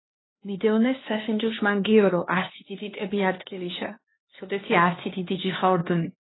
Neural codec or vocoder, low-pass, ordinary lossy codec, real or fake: codec, 16 kHz, 2 kbps, X-Codec, HuBERT features, trained on LibriSpeech; 7.2 kHz; AAC, 16 kbps; fake